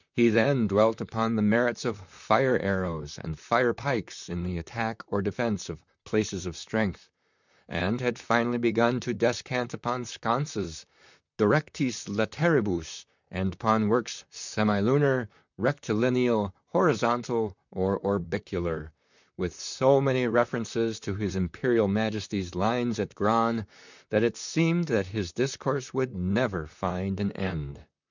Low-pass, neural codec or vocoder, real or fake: 7.2 kHz; vocoder, 44.1 kHz, 128 mel bands, Pupu-Vocoder; fake